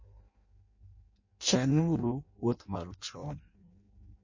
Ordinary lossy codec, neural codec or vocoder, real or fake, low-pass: MP3, 32 kbps; codec, 16 kHz in and 24 kHz out, 0.6 kbps, FireRedTTS-2 codec; fake; 7.2 kHz